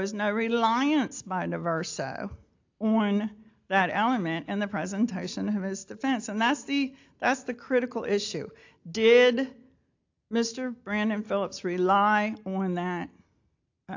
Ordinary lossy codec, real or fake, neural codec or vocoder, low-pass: AAC, 48 kbps; real; none; 7.2 kHz